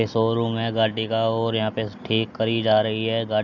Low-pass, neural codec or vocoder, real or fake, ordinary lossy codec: 7.2 kHz; none; real; none